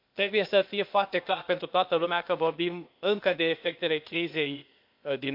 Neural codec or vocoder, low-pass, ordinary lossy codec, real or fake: codec, 16 kHz, 0.8 kbps, ZipCodec; 5.4 kHz; MP3, 48 kbps; fake